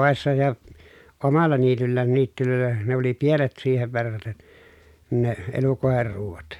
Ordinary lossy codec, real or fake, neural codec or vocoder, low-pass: none; real; none; 19.8 kHz